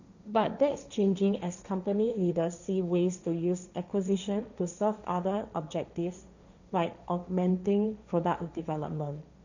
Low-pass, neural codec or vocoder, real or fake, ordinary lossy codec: 7.2 kHz; codec, 16 kHz, 1.1 kbps, Voila-Tokenizer; fake; none